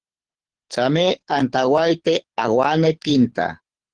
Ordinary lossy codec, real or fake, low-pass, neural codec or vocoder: Opus, 16 kbps; fake; 9.9 kHz; codec, 24 kHz, 6 kbps, HILCodec